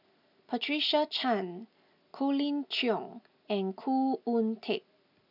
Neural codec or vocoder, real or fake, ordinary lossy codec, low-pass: none; real; none; 5.4 kHz